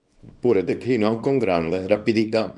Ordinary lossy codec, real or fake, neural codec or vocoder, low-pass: MP3, 96 kbps; fake; codec, 24 kHz, 0.9 kbps, WavTokenizer, small release; 10.8 kHz